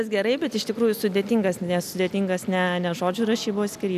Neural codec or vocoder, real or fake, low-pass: none; real; 14.4 kHz